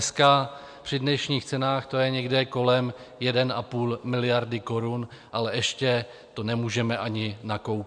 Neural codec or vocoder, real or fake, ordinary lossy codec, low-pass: none; real; MP3, 96 kbps; 9.9 kHz